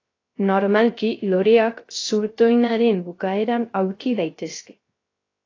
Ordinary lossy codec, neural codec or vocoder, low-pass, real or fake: AAC, 32 kbps; codec, 16 kHz, 0.3 kbps, FocalCodec; 7.2 kHz; fake